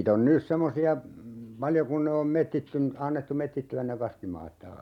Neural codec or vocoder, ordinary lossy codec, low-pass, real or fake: none; none; 19.8 kHz; real